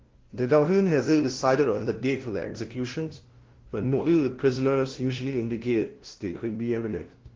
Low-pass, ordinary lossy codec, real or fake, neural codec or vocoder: 7.2 kHz; Opus, 16 kbps; fake; codec, 16 kHz, 0.5 kbps, FunCodec, trained on LibriTTS, 25 frames a second